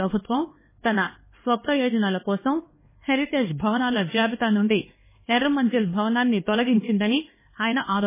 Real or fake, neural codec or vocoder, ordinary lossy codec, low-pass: fake; codec, 16 kHz, 2 kbps, X-Codec, HuBERT features, trained on LibriSpeech; MP3, 16 kbps; 3.6 kHz